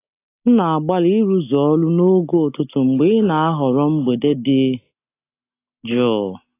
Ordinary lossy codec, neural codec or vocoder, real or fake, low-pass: AAC, 24 kbps; none; real; 3.6 kHz